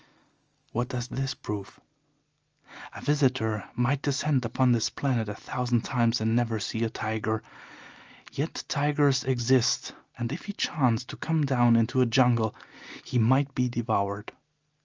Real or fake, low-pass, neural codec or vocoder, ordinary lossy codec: real; 7.2 kHz; none; Opus, 24 kbps